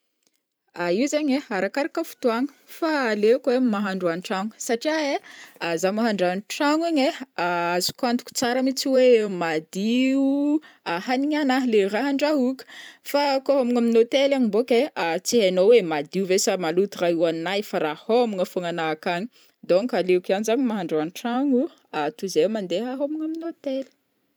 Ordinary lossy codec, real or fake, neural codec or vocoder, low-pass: none; fake; vocoder, 44.1 kHz, 128 mel bands every 512 samples, BigVGAN v2; none